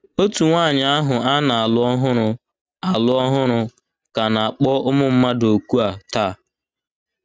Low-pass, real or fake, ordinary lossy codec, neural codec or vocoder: none; real; none; none